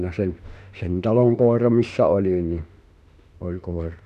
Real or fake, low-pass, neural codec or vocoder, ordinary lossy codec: fake; 14.4 kHz; autoencoder, 48 kHz, 32 numbers a frame, DAC-VAE, trained on Japanese speech; none